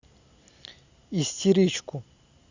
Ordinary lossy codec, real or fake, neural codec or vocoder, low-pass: Opus, 64 kbps; real; none; 7.2 kHz